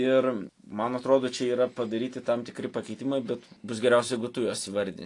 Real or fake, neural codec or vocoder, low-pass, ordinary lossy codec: real; none; 10.8 kHz; AAC, 48 kbps